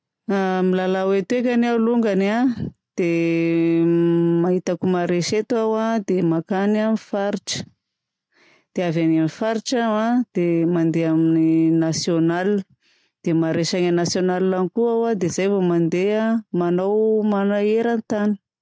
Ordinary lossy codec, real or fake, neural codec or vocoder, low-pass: none; real; none; none